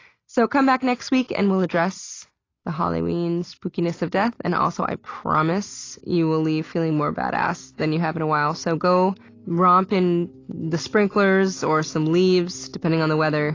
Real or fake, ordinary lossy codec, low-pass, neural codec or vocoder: real; AAC, 32 kbps; 7.2 kHz; none